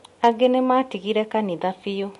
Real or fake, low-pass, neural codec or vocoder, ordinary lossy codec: real; 14.4 kHz; none; MP3, 48 kbps